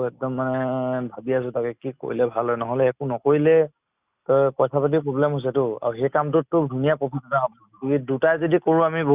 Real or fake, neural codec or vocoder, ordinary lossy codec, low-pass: real; none; Opus, 64 kbps; 3.6 kHz